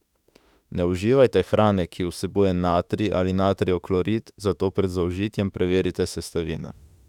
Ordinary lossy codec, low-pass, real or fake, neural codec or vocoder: none; 19.8 kHz; fake; autoencoder, 48 kHz, 32 numbers a frame, DAC-VAE, trained on Japanese speech